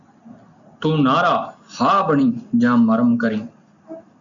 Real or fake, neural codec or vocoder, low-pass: real; none; 7.2 kHz